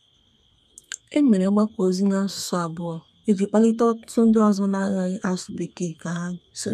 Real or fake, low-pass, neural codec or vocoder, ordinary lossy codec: fake; 14.4 kHz; codec, 32 kHz, 1.9 kbps, SNAC; none